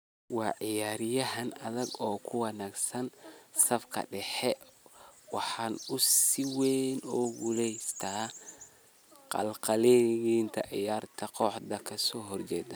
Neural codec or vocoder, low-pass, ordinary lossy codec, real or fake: none; none; none; real